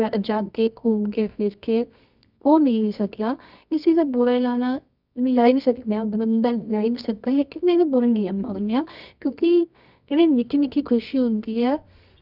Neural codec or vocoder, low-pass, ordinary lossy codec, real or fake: codec, 24 kHz, 0.9 kbps, WavTokenizer, medium music audio release; 5.4 kHz; none; fake